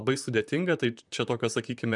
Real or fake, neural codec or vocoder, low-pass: fake; vocoder, 44.1 kHz, 128 mel bands, Pupu-Vocoder; 10.8 kHz